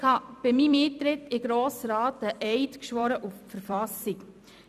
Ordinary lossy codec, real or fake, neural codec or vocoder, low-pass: none; fake; vocoder, 44.1 kHz, 128 mel bands every 256 samples, BigVGAN v2; 14.4 kHz